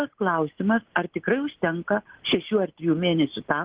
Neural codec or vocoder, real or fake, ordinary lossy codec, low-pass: none; real; Opus, 16 kbps; 3.6 kHz